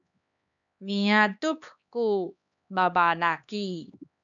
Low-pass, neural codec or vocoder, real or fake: 7.2 kHz; codec, 16 kHz, 2 kbps, X-Codec, HuBERT features, trained on LibriSpeech; fake